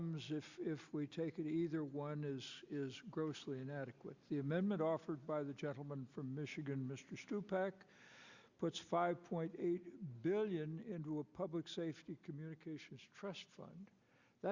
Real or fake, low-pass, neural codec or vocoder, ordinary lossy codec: real; 7.2 kHz; none; Opus, 64 kbps